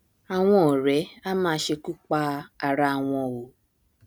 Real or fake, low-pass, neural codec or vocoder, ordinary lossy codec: real; none; none; none